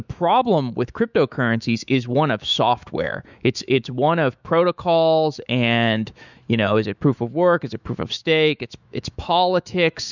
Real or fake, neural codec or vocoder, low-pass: fake; autoencoder, 48 kHz, 128 numbers a frame, DAC-VAE, trained on Japanese speech; 7.2 kHz